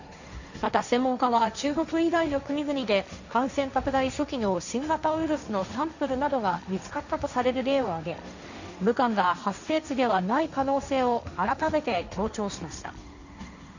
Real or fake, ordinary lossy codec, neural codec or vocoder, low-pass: fake; none; codec, 16 kHz, 1.1 kbps, Voila-Tokenizer; 7.2 kHz